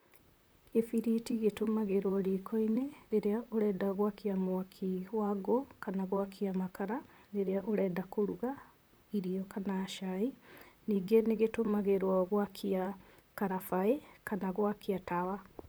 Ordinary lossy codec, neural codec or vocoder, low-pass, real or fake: none; vocoder, 44.1 kHz, 128 mel bands, Pupu-Vocoder; none; fake